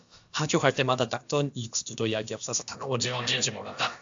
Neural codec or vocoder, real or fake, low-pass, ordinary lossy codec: codec, 16 kHz, about 1 kbps, DyCAST, with the encoder's durations; fake; 7.2 kHz; AAC, 64 kbps